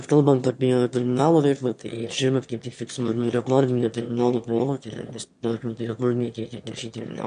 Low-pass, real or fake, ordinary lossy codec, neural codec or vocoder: 9.9 kHz; fake; MP3, 64 kbps; autoencoder, 22.05 kHz, a latent of 192 numbers a frame, VITS, trained on one speaker